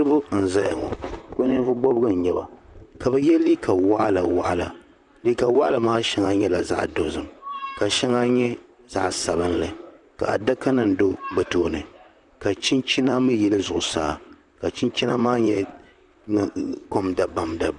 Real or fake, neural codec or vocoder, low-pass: fake; vocoder, 44.1 kHz, 128 mel bands, Pupu-Vocoder; 10.8 kHz